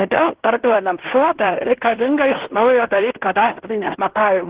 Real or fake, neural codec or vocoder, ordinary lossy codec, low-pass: fake; codec, 16 kHz in and 24 kHz out, 0.9 kbps, LongCat-Audio-Codec, fine tuned four codebook decoder; Opus, 16 kbps; 3.6 kHz